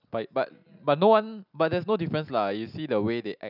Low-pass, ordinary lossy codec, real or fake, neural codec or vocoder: 5.4 kHz; none; real; none